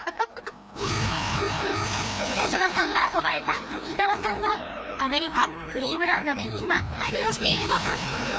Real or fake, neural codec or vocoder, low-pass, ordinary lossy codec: fake; codec, 16 kHz, 1 kbps, FreqCodec, larger model; none; none